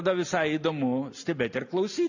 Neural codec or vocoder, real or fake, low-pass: none; real; 7.2 kHz